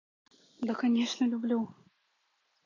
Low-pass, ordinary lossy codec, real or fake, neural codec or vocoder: 7.2 kHz; AAC, 32 kbps; real; none